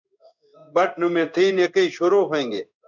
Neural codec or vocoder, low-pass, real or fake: codec, 16 kHz in and 24 kHz out, 1 kbps, XY-Tokenizer; 7.2 kHz; fake